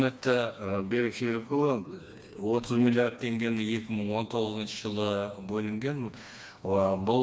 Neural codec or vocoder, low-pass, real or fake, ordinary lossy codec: codec, 16 kHz, 2 kbps, FreqCodec, smaller model; none; fake; none